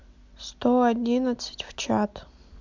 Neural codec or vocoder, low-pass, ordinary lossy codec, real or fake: none; 7.2 kHz; MP3, 64 kbps; real